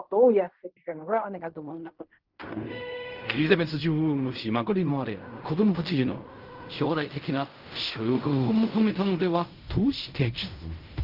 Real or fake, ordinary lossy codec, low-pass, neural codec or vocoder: fake; Opus, 24 kbps; 5.4 kHz; codec, 16 kHz in and 24 kHz out, 0.4 kbps, LongCat-Audio-Codec, fine tuned four codebook decoder